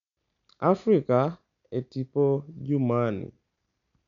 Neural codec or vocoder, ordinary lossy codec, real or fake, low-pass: none; none; real; 7.2 kHz